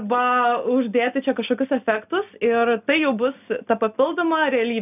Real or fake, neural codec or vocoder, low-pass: real; none; 3.6 kHz